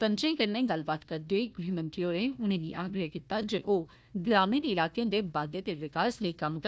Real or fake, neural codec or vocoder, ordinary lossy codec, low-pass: fake; codec, 16 kHz, 1 kbps, FunCodec, trained on Chinese and English, 50 frames a second; none; none